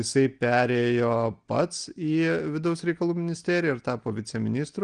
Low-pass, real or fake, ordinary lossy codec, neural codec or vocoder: 9.9 kHz; real; Opus, 24 kbps; none